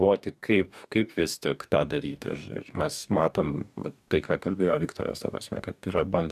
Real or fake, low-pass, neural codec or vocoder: fake; 14.4 kHz; codec, 44.1 kHz, 2.6 kbps, DAC